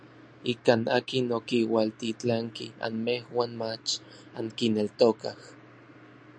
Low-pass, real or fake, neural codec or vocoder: 9.9 kHz; real; none